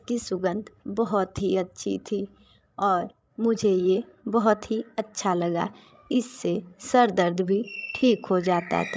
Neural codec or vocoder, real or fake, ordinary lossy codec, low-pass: codec, 16 kHz, 16 kbps, FreqCodec, larger model; fake; none; none